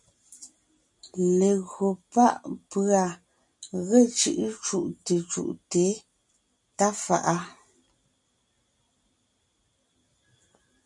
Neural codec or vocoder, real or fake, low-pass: none; real; 10.8 kHz